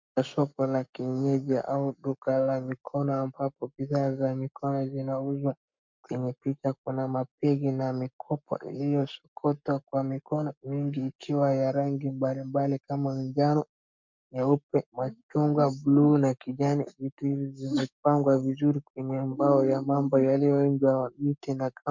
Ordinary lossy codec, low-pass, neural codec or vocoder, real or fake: MP3, 64 kbps; 7.2 kHz; codec, 44.1 kHz, 7.8 kbps, Pupu-Codec; fake